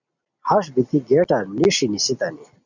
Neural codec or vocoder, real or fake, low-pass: none; real; 7.2 kHz